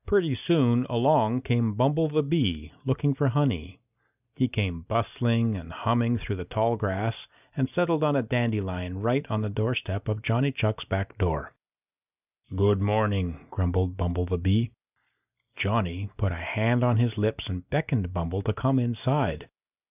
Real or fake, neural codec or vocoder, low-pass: real; none; 3.6 kHz